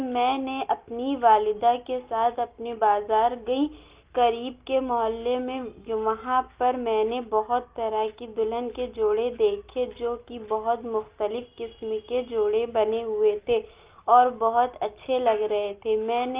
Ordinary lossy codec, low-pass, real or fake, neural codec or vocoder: Opus, 24 kbps; 3.6 kHz; real; none